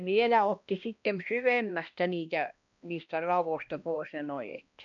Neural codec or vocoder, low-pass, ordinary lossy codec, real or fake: codec, 16 kHz, 1 kbps, X-Codec, HuBERT features, trained on balanced general audio; 7.2 kHz; none; fake